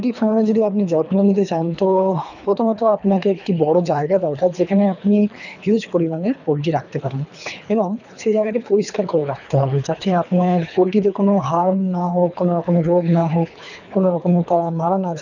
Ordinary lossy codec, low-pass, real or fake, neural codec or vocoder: none; 7.2 kHz; fake; codec, 24 kHz, 3 kbps, HILCodec